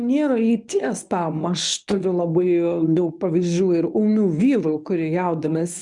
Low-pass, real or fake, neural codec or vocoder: 10.8 kHz; fake; codec, 24 kHz, 0.9 kbps, WavTokenizer, medium speech release version 1